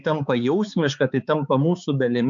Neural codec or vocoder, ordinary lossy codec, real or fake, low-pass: codec, 16 kHz, 4 kbps, X-Codec, HuBERT features, trained on balanced general audio; AAC, 64 kbps; fake; 7.2 kHz